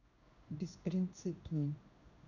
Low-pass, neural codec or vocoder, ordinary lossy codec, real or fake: 7.2 kHz; codec, 16 kHz in and 24 kHz out, 1 kbps, XY-Tokenizer; none; fake